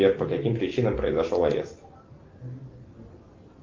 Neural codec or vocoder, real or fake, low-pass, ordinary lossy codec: none; real; 7.2 kHz; Opus, 32 kbps